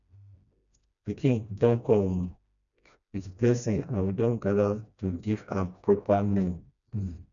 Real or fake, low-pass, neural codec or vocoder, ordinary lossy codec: fake; 7.2 kHz; codec, 16 kHz, 1 kbps, FreqCodec, smaller model; none